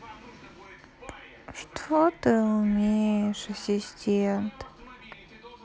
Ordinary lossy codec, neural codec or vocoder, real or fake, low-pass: none; none; real; none